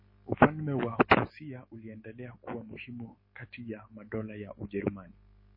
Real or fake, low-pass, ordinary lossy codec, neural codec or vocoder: fake; 5.4 kHz; MP3, 24 kbps; autoencoder, 48 kHz, 128 numbers a frame, DAC-VAE, trained on Japanese speech